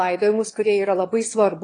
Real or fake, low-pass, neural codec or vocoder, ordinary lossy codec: fake; 9.9 kHz; autoencoder, 22.05 kHz, a latent of 192 numbers a frame, VITS, trained on one speaker; AAC, 32 kbps